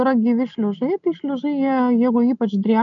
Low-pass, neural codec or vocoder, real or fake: 7.2 kHz; none; real